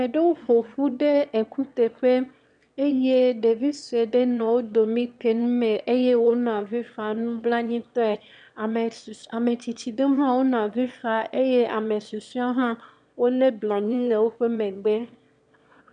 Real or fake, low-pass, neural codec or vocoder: fake; 9.9 kHz; autoencoder, 22.05 kHz, a latent of 192 numbers a frame, VITS, trained on one speaker